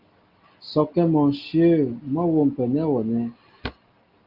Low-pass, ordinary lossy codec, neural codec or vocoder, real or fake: 5.4 kHz; Opus, 24 kbps; none; real